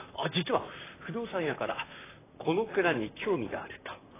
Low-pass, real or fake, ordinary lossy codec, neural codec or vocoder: 3.6 kHz; fake; AAC, 16 kbps; vocoder, 44.1 kHz, 128 mel bands, Pupu-Vocoder